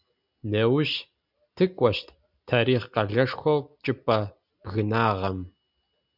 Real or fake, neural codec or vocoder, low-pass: real; none; 5.4 kHz